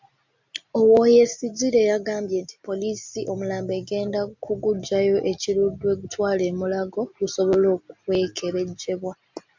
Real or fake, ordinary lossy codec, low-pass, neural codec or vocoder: real; MP3, 64 kbps; 7.2 kHz; none